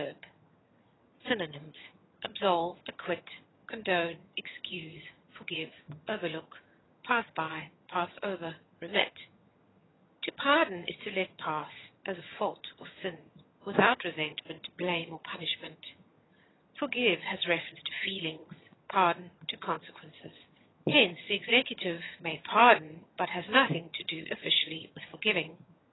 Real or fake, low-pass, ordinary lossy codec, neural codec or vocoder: fake; 7.2 kHz; AAC, 16 kbps; vocoder, 22.05 kHz, 80 mel bands, HiFi-GAN